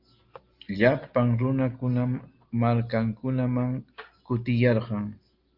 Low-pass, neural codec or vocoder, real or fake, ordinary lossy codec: 5.4 kHz; none; real; Opus, 32 kbps